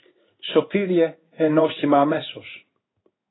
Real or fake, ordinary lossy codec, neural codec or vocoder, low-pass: fake; AAC, 16 kbps; codec, 16 kHz in and 24 kHz out, 1 kbps, XY-Tokenizer; 7.2 kHz